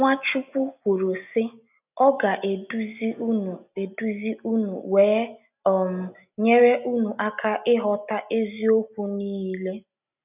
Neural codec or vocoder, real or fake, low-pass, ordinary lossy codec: none; real; 3.6 kHz; none